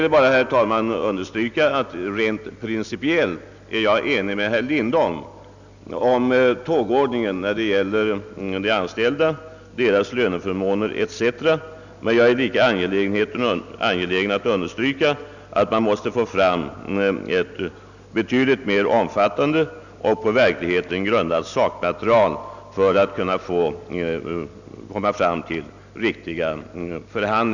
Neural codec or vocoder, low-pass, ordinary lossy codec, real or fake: none; 7.2 kHz; none; real